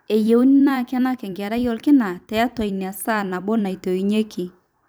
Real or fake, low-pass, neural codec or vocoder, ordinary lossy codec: fake; none; vocoder, 44.1 kHz, 128 mel bands every 256 samples, BigVGAN v2; none